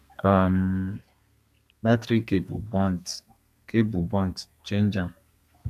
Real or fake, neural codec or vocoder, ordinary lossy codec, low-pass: fake; codec, 32 kHz, 1.9 kbps, SNAC; none; 14.4 kHz